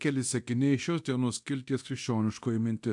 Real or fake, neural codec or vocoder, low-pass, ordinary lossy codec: fake; codec, 24 kHz, 0.9 kbps, DualCodec; 10.8 kHz; AAC, 64 kbps